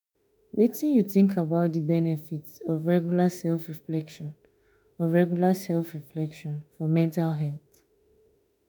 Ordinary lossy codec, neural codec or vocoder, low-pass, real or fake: none; autoencoder, 48 kHz, 32 numbers a frame, DAC-VAE, trained on Japanese speech; none; fake